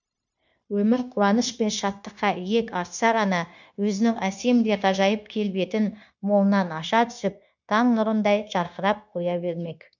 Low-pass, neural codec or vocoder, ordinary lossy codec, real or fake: 7.2 kHz; codec, 16 kHz, 0.9 kbps, LongCat-Audio-Codec; none; fake